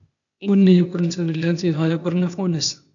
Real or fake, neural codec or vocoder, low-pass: fake; codec, 16 kHz, 0.8 kbps, ZipCodec; 7.2 kHz